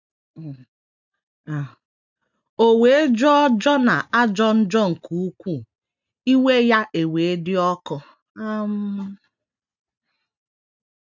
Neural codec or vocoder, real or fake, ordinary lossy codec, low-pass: none; real; none; 7.2 kHz